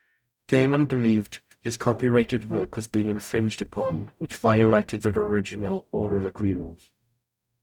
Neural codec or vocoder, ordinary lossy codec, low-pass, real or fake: codec, 44.1 kHz, 0.9 kbps, DAC; none; 19.8 kHz; fake